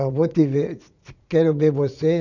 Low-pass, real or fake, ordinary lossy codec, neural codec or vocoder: 7.2 kHz; fake; none; vocoder, 44.1 kHz, 80 mel bands, Vocos